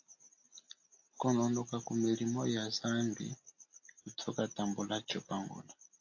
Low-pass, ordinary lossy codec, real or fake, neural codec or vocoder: 7.2 kHz; AAC, 48 kbps; real; none